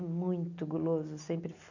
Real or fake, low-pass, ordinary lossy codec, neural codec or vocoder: real; 7.2 kHz; none; none